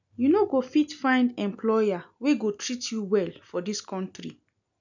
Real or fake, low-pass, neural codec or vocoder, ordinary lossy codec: real; 7.2 kHz; none; none